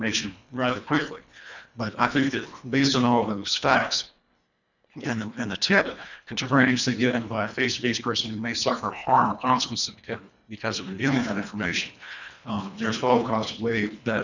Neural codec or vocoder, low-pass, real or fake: codec, 24 kHz, 1.5 kbps, HILCodec; 7.2 kHz; fake